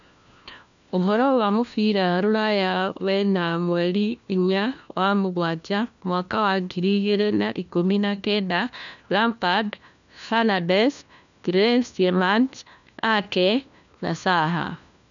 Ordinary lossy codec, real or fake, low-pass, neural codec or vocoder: none; fake; 7.2 kHz; codec, 16 kHz, 1 kbps, FunCodec, trained on LibriTTS, 50 frames a second